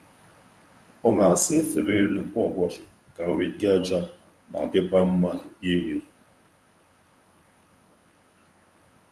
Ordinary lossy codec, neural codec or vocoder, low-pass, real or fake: none; codec, 24 kHz, 0.9 kbps, WavTokenizer, medium speech release version 1; none; fake